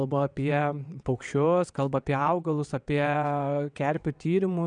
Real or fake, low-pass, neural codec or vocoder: fake; 9.9 kHz; vocoder, 22.05 kHz, 80 mel bands, WaveNeXt